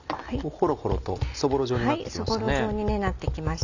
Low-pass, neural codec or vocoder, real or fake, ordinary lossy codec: 7.2 kHz; none; real; none